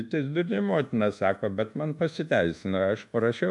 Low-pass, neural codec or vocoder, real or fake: 10.8 kHz; codec, 24 kHz, 1.2 kbps, DualCodec; fake